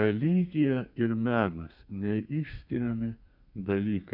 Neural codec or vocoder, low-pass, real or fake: codec, 32 kHz, 1.9 kbps, SNAC; 5.4 kHz; fake